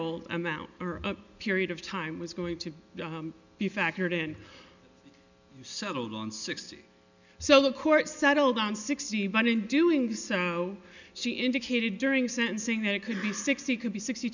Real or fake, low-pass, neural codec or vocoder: real; 7.2 kHz; none